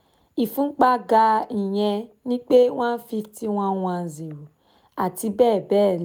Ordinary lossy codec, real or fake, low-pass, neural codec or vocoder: none; real; none; none